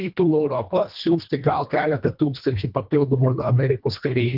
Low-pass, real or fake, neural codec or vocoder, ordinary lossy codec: 5.4 kHz; fake; codec, 24 kHz, 1.5 kbps, HILCodec; Opus, 24 kbps